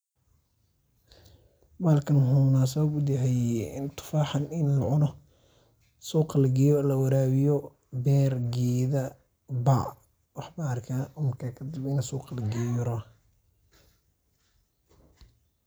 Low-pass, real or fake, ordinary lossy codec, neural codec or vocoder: none; real; none; none